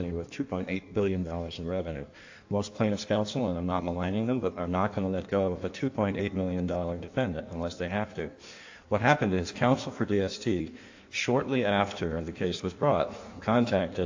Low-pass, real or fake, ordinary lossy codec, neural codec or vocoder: 7.2 kHz; fake; AAC, 48 kbps; codec, 16 kHz in and 24 kHz out, 1.1 kbps, FireRedTTS-2 codec